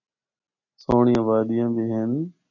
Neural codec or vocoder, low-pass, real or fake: none; 7.2 kHz; real